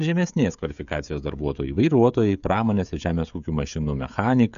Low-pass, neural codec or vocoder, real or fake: 7.2 kHz; codec, 16 kHz, 16 kbps, FreqCodec, smaller model; fake